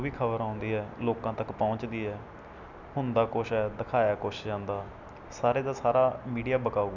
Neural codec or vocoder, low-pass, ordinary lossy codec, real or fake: none; 7.2 kHz; none; real